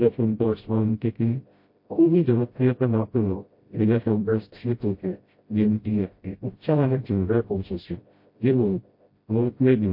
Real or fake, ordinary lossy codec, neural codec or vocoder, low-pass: fake; MP3, 32 kbps; codec, 16 kHz, 0.5 kbps, FreqCodec, smaller model; 5.4 kHz